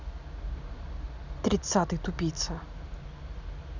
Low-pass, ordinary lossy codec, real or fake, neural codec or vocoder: 7.2 kHz; none; real; none